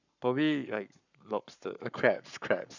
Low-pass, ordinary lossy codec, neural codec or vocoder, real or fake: 7.2 kHz; none; codec, 44.1 kHz, 7.8 kbps, Pupu-Codec; fake